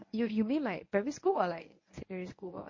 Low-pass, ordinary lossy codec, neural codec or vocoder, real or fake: 7.2 kHz; MP3, 32 kbps; codec, 24 kHz, 0.9 kbps, WavTokenizer, medium speech release version 1; fake